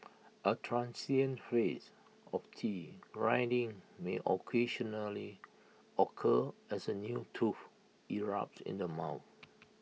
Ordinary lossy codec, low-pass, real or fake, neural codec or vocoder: none; none; real; none